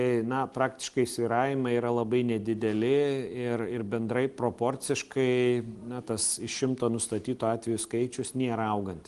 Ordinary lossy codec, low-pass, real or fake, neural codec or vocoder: Opus, 24 kbps; 10.8 kHz; real; none